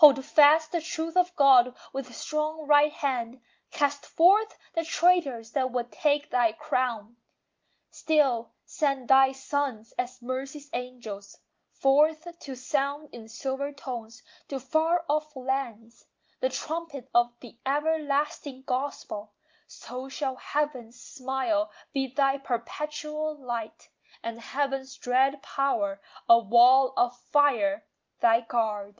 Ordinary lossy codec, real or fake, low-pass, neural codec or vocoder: Opus, 24 kbps; real; 7.2 kHz; none